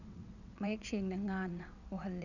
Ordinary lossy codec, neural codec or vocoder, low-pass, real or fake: none; vocoder, 22.05 kHz, 80 mel bands, WaveNeXt; 7.2 kHz; fake